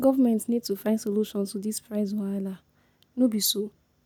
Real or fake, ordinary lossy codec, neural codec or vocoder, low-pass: real; none; none; none